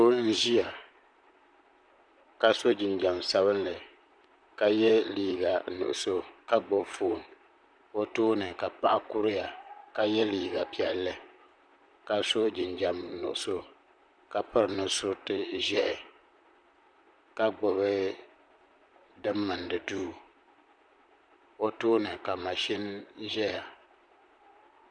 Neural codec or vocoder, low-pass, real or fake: vocoder, 44.1 kHz, 128 mel bands, Pupu-Vocoder; 9.9 kHz; fake